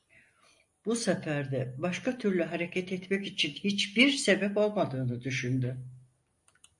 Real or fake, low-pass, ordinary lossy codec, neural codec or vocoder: real; 10.8 kHz; MP3, 48 kbps; none